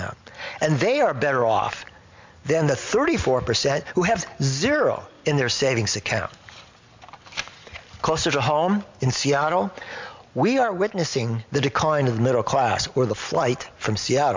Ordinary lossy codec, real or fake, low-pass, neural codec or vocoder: MP3, 64 kbps; real; 7.2 kHz; none